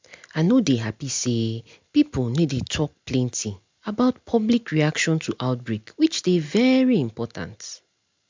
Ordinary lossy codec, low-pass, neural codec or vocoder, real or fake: MP3, 64 kbps; 7.2 kHz; none; real